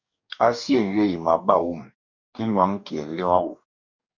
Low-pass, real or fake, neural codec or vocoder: 7.2 kHz; fake; codec, 44.1 kHz, 2.6 kbps, DAC